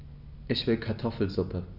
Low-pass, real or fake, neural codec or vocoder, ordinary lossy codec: 5.4 kHz; real; none; Opus, 64 kbps